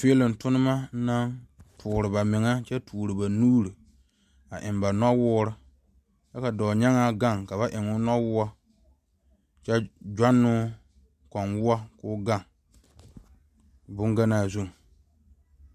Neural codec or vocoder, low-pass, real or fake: none; 14.4 kHz; real